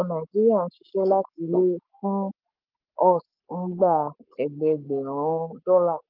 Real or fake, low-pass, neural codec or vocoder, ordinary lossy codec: fake; 5.4 kHz; codec, 44.1 kHz, 7.8 kbps, Pupu-Codec; Opus, 24 kbps